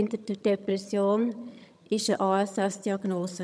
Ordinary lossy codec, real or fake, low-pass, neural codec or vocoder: none; fake; none; vocoder, 22.05 kHz, 80 mel bands, HiFi-GAN